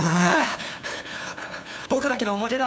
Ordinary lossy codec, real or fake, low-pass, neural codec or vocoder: none; fake; none; codec, 16 kHz, 2 kbps, FunCodec, trained on LibriTTS, 25 frames a second